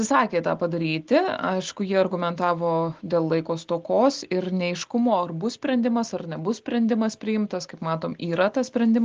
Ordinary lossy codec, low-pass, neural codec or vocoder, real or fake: Opus, 16 kbps; 7.2 kHz; none; real